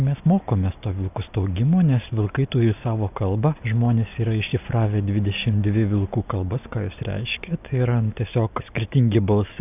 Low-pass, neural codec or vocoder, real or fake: 3.6 kHz; none; real